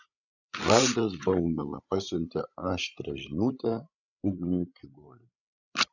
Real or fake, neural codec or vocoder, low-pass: fake; codec, 16 kHz, 16 kbps, FreqCodec, larger model; 7.2 kHz